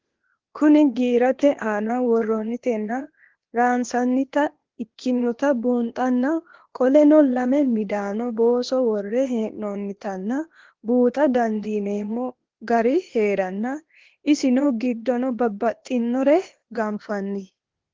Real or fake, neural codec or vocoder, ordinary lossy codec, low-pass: fake; codec, 16 kHz, 0.8 kbps, ZipCodec; Opus, 16 kbps; 7.2 kHz